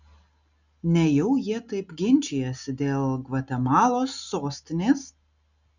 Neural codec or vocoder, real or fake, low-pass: none; real; 7.2 kHz